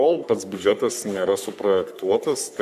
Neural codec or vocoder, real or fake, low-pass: codec, 44.1 kHz, 3.4 kbps, Pupu-Codec; fake; 14.4 kHz